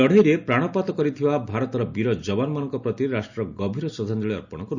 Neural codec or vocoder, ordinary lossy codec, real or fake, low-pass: none; none; real; 7.2 kHz